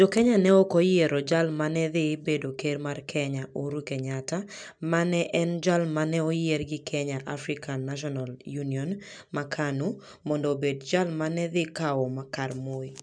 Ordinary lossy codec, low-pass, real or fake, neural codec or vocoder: none; 9.9 kHz; real; none